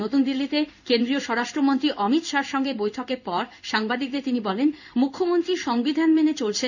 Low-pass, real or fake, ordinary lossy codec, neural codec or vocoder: 7.2 kHz; fake; none; codec, 16 kHz in and 24 kHz out, 1 kbps, XY-Tokenizer